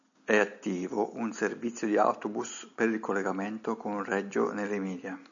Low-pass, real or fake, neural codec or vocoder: 7.2 kHz; real; none